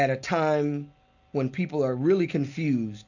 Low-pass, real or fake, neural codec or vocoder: 7.2 kHz; real; none